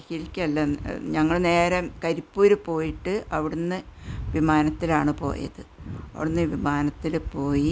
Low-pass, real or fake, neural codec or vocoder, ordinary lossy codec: none; real; none; none